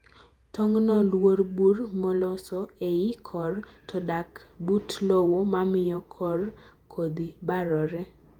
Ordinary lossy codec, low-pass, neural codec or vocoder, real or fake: Opus, 32 kbps; 19.8 kHz; vocoder, 48 kHz, 128 mel bands, Vocos; fake